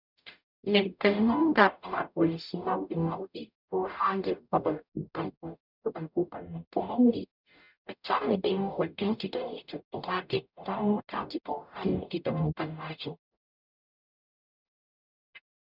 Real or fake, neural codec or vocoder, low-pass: fake; codec, 44.1 kHz, 0.9 kbps, DAC; 5.4 kHz